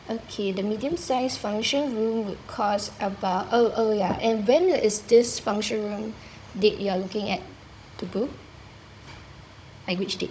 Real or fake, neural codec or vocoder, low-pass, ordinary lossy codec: fake; codec, 16 kHz, 16 kbps, FunCodec, trained on Chinese and English, 50 frames a second; none; none